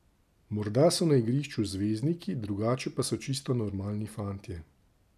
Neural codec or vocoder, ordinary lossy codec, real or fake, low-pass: none; none; real; 14.4 kHz